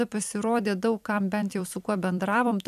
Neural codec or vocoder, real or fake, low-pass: vocoder, 44.1 kHz, 128 mel bands every 256 samples, BigVGAN v2; fake; 14.4 kHz